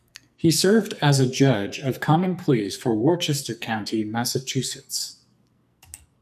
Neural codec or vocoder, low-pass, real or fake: codec, 44.1 kHz, 2.6 kbps, SNAC; 14.4 kHz; fake